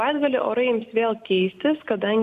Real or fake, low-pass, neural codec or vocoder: real; 14.4 kHz; none